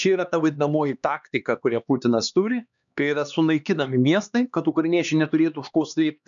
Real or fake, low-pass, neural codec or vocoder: fake; 7.2 kHz; codec, 16 kHz, 2 kbps, X-Codec, HuBERT features, trained on LibriSpeech